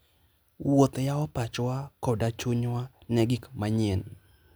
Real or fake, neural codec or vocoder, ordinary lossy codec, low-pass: real; none; none; none